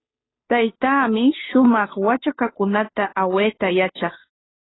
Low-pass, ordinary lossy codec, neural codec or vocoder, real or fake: 7.2 kHz; AAC, 16 kbps; codec, 16 kHz, 2 kbps, FunCodec, trained on Chinese and English, 25 frames a second; fake